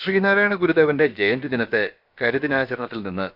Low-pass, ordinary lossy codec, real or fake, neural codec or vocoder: 5.4 kHz; none; fake; codec, 16 kHz, about 1 kbps, DyCAST, with the encoder's durations